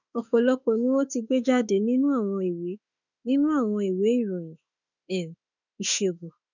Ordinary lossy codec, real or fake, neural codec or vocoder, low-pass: none; fake; autoencoder, 48 kHz, 32 numbers a frame, DAC-VAE, trained on Japanese speech; 7.2 kHz